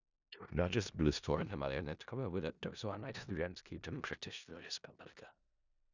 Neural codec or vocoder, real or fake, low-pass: codec, 16 kHz in and 24 kHz out, 0.4 kbps, LongCat-Audio-Codec, four codebook decoder; fake; 7.2 kHz